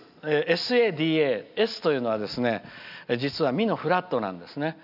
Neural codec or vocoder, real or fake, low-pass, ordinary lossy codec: none; real; 5.4 kHz; none